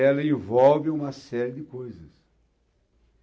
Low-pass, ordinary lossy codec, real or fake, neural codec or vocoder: none; none; real; none